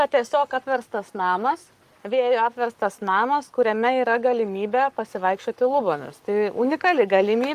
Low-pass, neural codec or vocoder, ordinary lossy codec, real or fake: 14.4 kHz; codec, 44.1 kHz, 7.8 kbps, Pupu-Codec; Opus, 32 kbps; fake